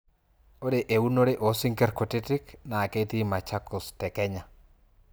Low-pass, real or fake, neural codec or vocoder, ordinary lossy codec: none; real; none; none